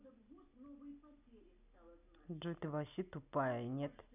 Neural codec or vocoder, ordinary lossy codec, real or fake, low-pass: none; none; real; 3.6 kHz